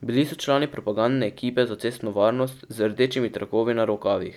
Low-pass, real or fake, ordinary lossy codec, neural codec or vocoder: 19.8 kHz; real; none; none